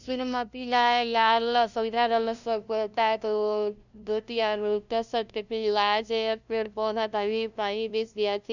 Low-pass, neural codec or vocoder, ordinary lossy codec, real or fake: 7.2 kHz; codec, 16 kHz, 0.5 kbps, FunCodec, trained on LibriTTS, 25 frames a second; none; fake